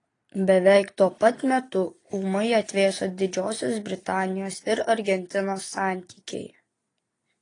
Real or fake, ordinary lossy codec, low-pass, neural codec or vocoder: real; AAC, 32 kbps; 9.9 kHz; none